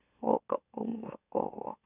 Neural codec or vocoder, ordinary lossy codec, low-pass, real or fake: autoencoder, 44.1 kHz, a latent of 192 numbers a frame, MeloTTS; none; 3.6 kHz; fake